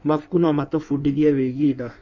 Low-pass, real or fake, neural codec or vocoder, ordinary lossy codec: 7.2 kHz; fake; codec, 16 kHz in and 24 kHz out, 1.1 kbps, FireRedTTS-2 codec; none